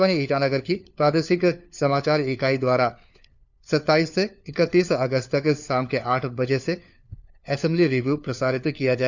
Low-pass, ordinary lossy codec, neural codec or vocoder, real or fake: 7.2 kHz; none; codec, 16 kHz, 4 kbps, FunCodec, trained on LibriTTS, 50 frames a second; fake